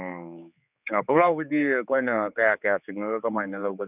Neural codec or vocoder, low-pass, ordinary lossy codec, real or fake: codec, 16 kHz, 4 kbps, X-Codec, HuBERT features, trained on general audio; 3.6 kHz; none; fake